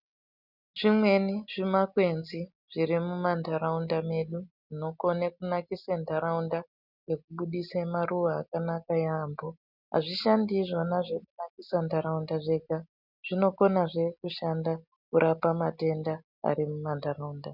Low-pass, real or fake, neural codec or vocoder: 5.4 kHz; real; none